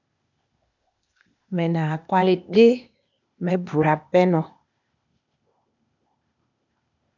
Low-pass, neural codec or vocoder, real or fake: 7.2 kHz; codec, 16 kHz, 0.8 kbps, ZipCodec; fake